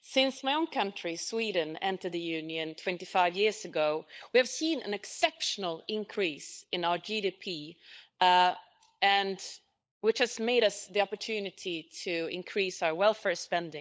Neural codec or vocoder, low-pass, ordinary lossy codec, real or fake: codec, 16 kHz, 16 kbps, FunCodec, trained on LibriTTS, 50 frames a second; none; none; fake